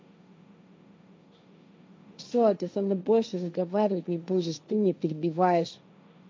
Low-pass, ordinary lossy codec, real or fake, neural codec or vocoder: none; none; fake; codec, 16 kHz, 1.1 kbps, Voila-Tokenizer